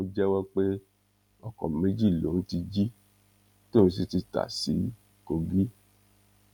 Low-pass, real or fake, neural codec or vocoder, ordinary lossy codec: 19.8 kHz; real; none; none